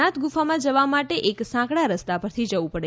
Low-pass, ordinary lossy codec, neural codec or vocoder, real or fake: none; none; none; real